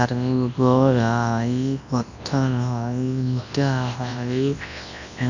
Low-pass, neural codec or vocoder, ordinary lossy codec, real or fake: 7.2 kHz; codec, 24 kHz, 0.9 kbps, WavTokenizer, large speech release; none; fake